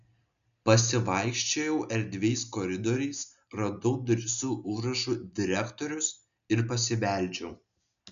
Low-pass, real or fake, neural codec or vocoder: 7.2 kHz; real; none